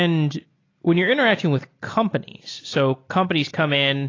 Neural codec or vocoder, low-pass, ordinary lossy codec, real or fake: none; 7.2 kHz; AAC, 32 kbps; real